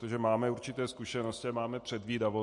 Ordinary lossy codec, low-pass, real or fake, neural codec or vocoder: MP3, 48 kbps; 10.8 kHz; real; none